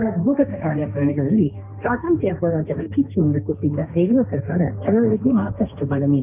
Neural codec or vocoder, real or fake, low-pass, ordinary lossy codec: codec, 16 kHz, 1.1 kbps, Voila-Tokenizer; fake; 3.6 kHz; none